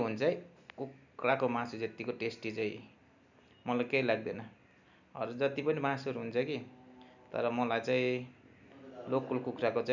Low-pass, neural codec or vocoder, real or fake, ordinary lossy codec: 7.2 kHz; none; real; none